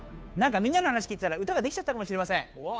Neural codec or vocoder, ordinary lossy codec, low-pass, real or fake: codec, 16 kHz, 2 kbps, FunCodec, trained on Chinese and English, 25 frames a second; none; none; fake